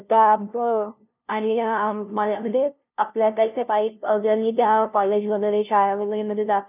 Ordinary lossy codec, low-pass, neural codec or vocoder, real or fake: none; 3.6 kHz; codec, 16 kHz, 0.5 kbps, FunCodec, trained on LibriTTS, 25 frames a second; fake